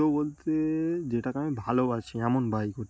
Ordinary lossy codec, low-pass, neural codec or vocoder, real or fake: none; none; none; real